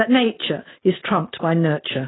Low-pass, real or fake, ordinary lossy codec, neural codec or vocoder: 7.2 kHz; real; AAC, 16 kbps; none